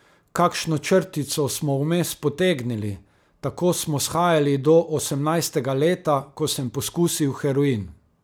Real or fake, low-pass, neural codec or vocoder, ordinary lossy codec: real; none; none; none